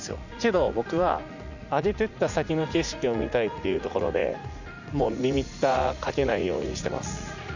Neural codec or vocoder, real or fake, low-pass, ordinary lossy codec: vocoder, 44.1 kHz, 80 mel bands, Vocos; fake; 7.2 kHz; none